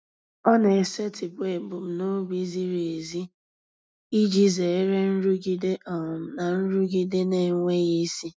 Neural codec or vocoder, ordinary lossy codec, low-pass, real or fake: none; none; none; real